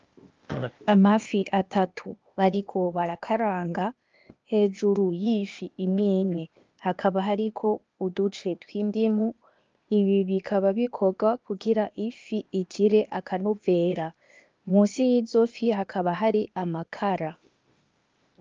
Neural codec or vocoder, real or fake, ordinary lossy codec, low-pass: codec, 16 kHz, 0.8 kbps, ZipCodec; fake; Opus, 24 kbps; 7.2 kHz